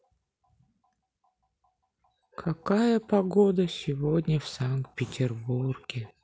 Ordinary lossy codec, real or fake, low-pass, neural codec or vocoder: none; real; none; none